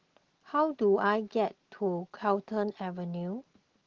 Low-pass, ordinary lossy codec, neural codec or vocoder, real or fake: 7.2 kHz; Opus, 16 kbps; none; real